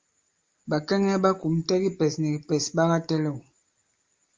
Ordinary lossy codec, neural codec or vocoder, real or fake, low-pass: Opus, 32 kbps; none; real; 7.2 kHz